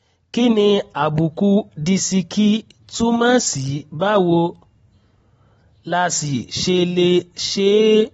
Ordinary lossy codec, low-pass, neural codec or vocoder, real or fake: AAC, 24 kbps; 19.8 kHz; none; real